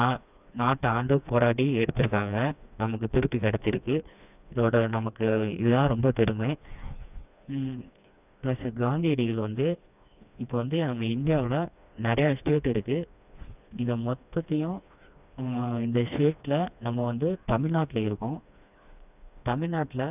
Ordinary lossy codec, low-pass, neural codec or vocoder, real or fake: none; 3.6 kHz; codec, 16 kHz, 2 kbps, FreqCodec, smaller model; fake